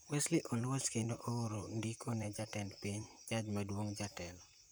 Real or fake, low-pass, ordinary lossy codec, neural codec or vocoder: fake; none; none; vocoder, 44.1 kHz, 128 mel bands, Pupu-Vocoder